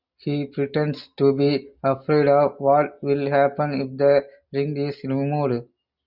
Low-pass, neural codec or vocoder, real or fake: 5.4 kHz; none; real